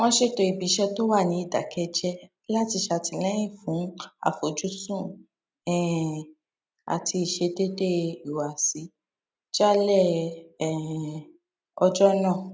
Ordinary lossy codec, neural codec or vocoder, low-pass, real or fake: none; none; none; real